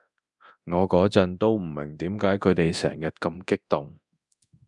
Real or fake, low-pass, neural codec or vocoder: fake; 10.8 kHz; codec, 24 kHz, 0.9 kbps, DualCodec